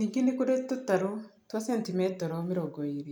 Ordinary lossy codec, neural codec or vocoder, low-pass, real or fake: none; none; none; real